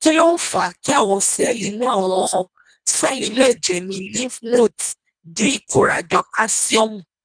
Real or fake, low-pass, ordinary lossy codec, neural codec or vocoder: fake; 9.9 kHz; none; codec, 24 kHz, 1.5 kbps, HILCodec